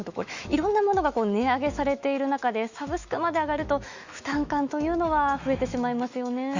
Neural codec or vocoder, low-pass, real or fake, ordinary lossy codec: autoencoder, 48 kHz, 128 numbers a frame, DAC-VAE, trained on Japanese speech; 7.2 kHz; fake; Opus, 64 kbps